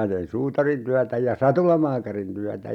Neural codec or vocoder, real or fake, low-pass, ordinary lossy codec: none; real; 19.8 kHz; none